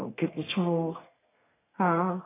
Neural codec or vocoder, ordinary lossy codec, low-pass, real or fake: codec, 32 kHz, 1.9 kbps, SNAC; AAC, 16 kbps; 3.6 kHz; fake